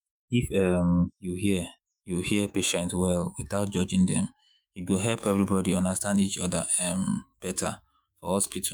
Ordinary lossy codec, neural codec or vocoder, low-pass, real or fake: none; autoencoder, 48 kHz, 128 numbers a frame, DAC-VAE, trained on Japanese speech; none; fake